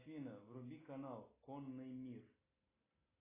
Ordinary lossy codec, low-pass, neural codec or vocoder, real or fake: AAC, 16 kbps; 3.6 kHz; none; real